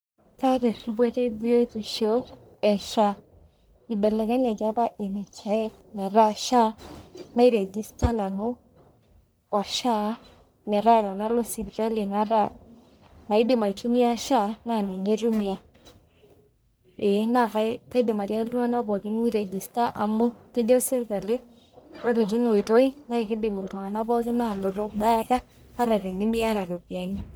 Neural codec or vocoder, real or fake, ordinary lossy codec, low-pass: codec, 44.1 kHz, 1.7 kbps, Pupu-Codec; fake; none; none